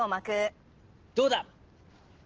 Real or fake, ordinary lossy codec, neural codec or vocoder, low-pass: real; Opus, 16 kbps; none; 7.2 kHz